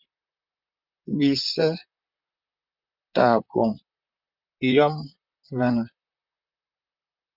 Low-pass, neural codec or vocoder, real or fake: 5.4 kHz; vocoder, 44.1 kHz, 128 mel bands, Pupu-Vocoder; fake